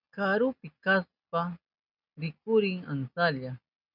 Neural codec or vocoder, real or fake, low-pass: none; real; 5.4 kHz